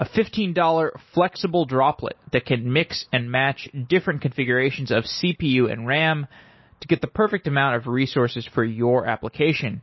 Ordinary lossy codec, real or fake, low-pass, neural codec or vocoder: MP3, 24 kbps; real; 7.2 kHz; none